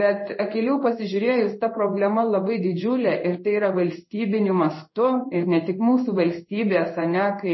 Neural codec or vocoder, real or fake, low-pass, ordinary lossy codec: codec, 16 kHz in and 24 kHz out, 1 kbps, XY-Tokenizer; fake; 7.2 kHz; MP3, 24 kbps